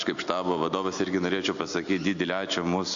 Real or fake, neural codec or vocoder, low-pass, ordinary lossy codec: real; none; 7.2 kHz; MP3, 64 kbps